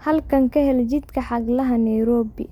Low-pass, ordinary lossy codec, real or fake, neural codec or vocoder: 19.8 kHz; MP3, 96 kbps; real; none